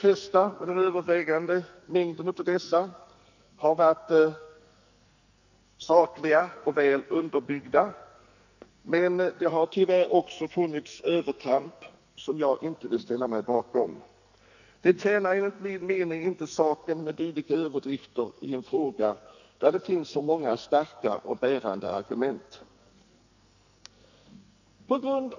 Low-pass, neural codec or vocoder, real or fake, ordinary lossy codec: 7.2 kHz; codec, 44.1 kHz, 2.6 kbps, SNAC; fake; none